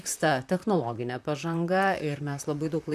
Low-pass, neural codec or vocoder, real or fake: 14.4 kHz; vocoder, 44.1 kHz, 128 mel bands, Pupu-Vocoder; fake